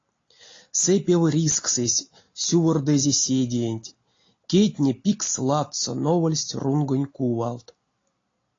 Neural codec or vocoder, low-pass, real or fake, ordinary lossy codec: none; 7.2 kHz; real; AAC, 32 kbps